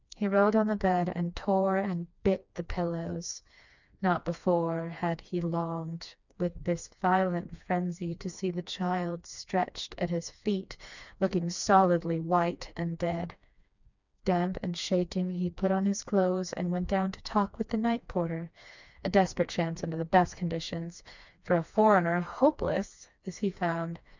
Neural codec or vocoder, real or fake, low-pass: codec, 16 kHz, 2 kbps, FreqCodec, smaller model; fake; 7.2 kHz